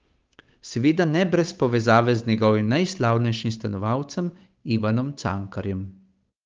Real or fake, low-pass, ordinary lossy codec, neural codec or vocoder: fake; 7.2 kHz; Opus, 24 kbps; codec, 16 kHz, 8 kbps, FunCodec, trained on Chinese and English, 25 frames a second